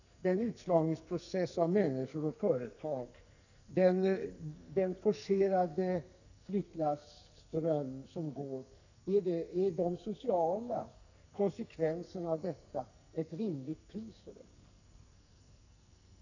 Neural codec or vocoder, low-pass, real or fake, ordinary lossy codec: codec, 44.1 kHz, 2.6 kbps, SNAC; 7.2 kHz; fake; none